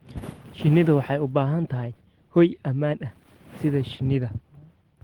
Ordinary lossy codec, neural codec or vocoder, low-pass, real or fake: Opus, 16 kbps; none; 19.8 kHz; real